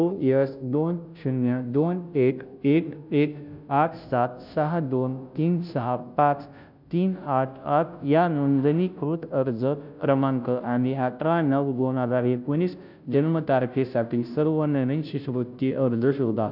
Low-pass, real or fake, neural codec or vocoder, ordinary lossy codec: 5.4 kHz; fake; codec, 16 kHz, 0.5 kbps, FunCodec, trained on Chinese and English, 25 frames a second; none